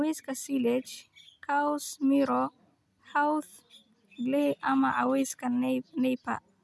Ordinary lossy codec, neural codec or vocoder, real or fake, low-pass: none; none; real; none